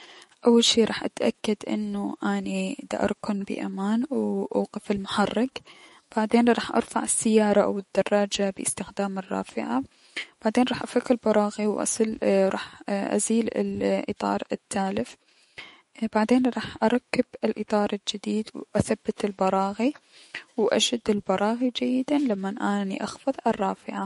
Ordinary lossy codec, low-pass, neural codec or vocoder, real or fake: MP3, 48 kbps; 19.8 kHz; autoencoder, 48 kHz, 128 numbers a frame, DAC-VAE, trained on Japanese speech; fake